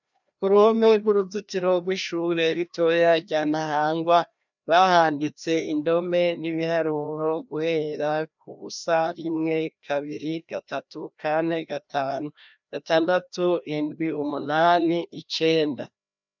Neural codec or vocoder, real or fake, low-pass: codec, 16 kHz, 1 kbps, FreqCodec, larger model; fake; 7.2 kHz